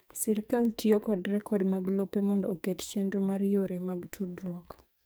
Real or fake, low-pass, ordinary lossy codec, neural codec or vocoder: fake; none; none; codec, 44.1 kHz, 2.6 kbps, SNAC